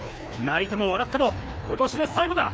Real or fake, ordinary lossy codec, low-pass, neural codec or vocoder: fake; none; none; codec, 16 kHz, 2 kbps, FreqCodec, larger model